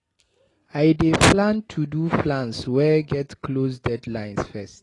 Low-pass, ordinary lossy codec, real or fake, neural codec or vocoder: 10.8 kHz; AAC, 32 kbps; real; none